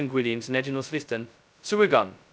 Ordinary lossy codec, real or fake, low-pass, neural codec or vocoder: none; fake; none; codec, 16 kHz, 0.2 kbps, FocalCodec